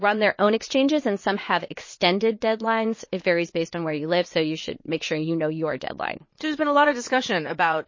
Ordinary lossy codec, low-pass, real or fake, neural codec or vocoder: MP3, 32 kbps; 7.2 kHz; fake; vocoder, 22.05 kHz, 80 mel bands, Vocos